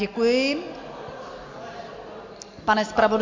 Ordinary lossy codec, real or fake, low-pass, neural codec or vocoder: MP3, 48 kbps; real; 7.2 kHz; none